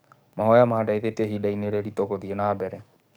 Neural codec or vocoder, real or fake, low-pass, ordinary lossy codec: codec, 44.1 kHz, 7.8 kbps, DAC; fake; none; none